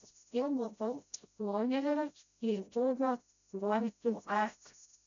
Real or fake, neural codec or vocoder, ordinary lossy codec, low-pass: fake; codec, 16 kHz, 0.5 kbps, FreqCodec, smaller model; MP3, 96 kbps; 7.2 kHz